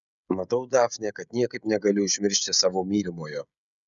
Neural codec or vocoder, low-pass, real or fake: codec, 16 kHz, 16 kbps, FreqCodec, smaller model; 7.2 kHz; fake